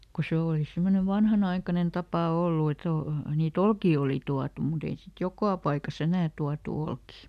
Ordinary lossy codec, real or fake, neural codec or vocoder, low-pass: none; real; none; 14.4 kHz